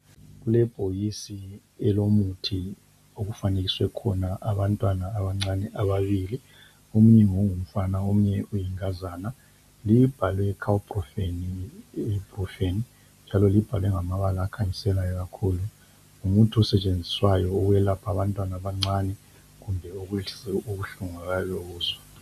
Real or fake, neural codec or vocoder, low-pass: real; none; 14.4 kHz